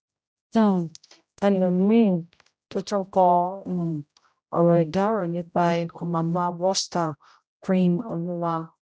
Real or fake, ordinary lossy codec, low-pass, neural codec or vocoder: fake; none; none; codec, 16 kHz, 0.5 kbps, X-Codec, HuBERT features, trained on general audio